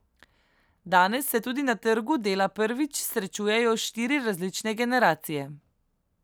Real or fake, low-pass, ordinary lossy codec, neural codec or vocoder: real; none; none; none